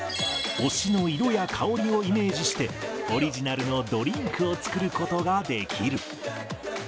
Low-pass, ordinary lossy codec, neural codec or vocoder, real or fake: none; none; none; real